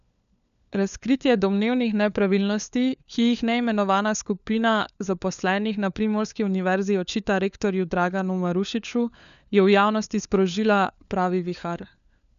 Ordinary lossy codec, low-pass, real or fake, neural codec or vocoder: none; 7.2 kHz; fake; codec, 16 kHz, 4 kbps, FunCodec, trained on LibriTTS, 50 frames a second